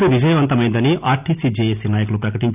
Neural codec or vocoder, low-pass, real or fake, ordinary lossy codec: none; 3.6 kHz; real; none